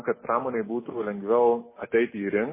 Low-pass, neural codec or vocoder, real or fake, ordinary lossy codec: 3.6 kHz; none; real; MP3, 16 kbps